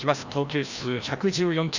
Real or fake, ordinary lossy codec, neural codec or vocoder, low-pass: fake; none; codec, 16 kHz, 1 kbps, FreqCodec, larger model; 7.2 kHz